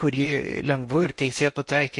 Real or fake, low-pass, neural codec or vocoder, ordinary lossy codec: fake; 10.8 kHz; codec, 16 kHz in and 24 kHz out, 0.8 kbps, FocalCodec, streaming, 65536 codes; MP3, 48 kbps